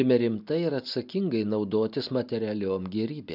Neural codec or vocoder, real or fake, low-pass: none; real; 5.4 kHz